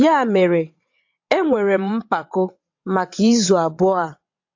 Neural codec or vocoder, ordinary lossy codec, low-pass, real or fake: vocoder, 22.05 kHz, 80 mel bands, WaveNeXt; AAC, 48 kbps; 7.2 kHz; fake